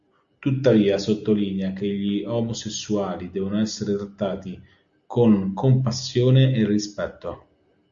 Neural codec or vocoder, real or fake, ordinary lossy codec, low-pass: none; real; Opus, 64 kbps; 7.2 kHz